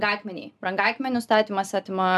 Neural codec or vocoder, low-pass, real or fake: none; 14.4 kHz; real